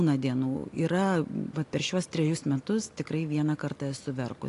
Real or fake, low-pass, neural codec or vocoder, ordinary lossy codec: real; 10.8 kHz; none; AAC, 48 kbps